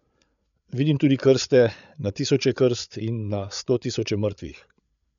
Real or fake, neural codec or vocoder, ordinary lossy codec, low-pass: fake; codec, 16 kHz, 8 kbps, FreqCodec, larger model; none; 7.2 kHz